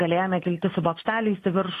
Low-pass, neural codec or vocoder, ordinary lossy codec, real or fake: 14.4 kHz; none; AAC, 48 kbps; real